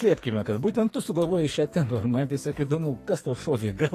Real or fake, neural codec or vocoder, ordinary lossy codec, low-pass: fake; codec, 44.1 kHz, 2.6 kbps, SNAC; AAC, 48 kbps; 14.4 kHz